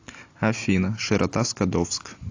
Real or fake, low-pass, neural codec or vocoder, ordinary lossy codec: real; 7.2 kHz; none; AAC, 48 kbps